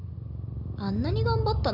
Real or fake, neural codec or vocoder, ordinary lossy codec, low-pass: real; none; none; 5.4 kHz